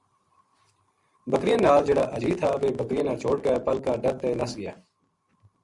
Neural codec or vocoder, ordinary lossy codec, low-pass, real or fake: vocoder, 44.1 kHz, 128 mel bands every 512 samples, BigVGAN v2; Opus, 64 kbps; 10.8 kHz; fake